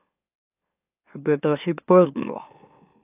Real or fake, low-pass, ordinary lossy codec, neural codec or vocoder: fake; 3.6 kHz; AAC, 32 kbps; autoencoder, 44.1 kHz, a latent of 192 numbers a frame, MeloTTS